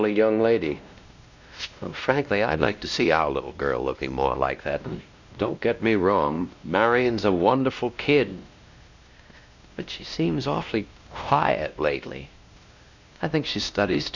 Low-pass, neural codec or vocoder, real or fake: 7.2 kHz; codec, 16 kHz, 1 kbps, X-Codec, WavLM features, trained on Multilingual LibriSpeech; fake